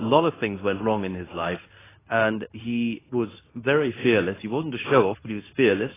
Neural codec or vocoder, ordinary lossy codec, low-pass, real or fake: codec, 24 kHz, 0.9 kbps, WavTokenizer, medium speech release version 2; AAC, 16 kbps; 3.6 kHz; fake